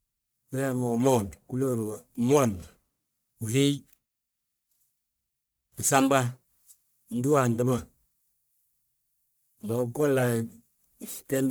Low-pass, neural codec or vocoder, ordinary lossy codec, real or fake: none; codec, 44.1 kHz, 1.7 kbps, Pupu-Codec; none; fake